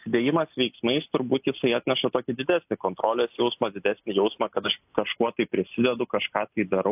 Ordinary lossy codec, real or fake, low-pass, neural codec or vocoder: AAC, 32 kbps; real; 3.6 kHz; none